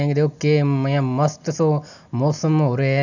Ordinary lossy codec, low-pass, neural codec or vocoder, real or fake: none; 7.2 kHz; none; real